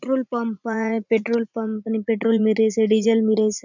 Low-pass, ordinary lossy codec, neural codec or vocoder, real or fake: 7.2 kHz; none; vocoder, 44.1 kHz, 80 mel bands, Vocos; fake